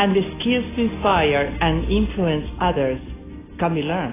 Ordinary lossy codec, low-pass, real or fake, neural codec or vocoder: AAC, 16 kbps; 3.6 kHz; real; none